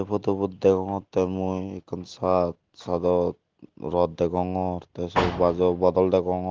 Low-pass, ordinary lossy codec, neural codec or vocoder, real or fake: 7.2 kHz; Opus, 16 kbps; none; real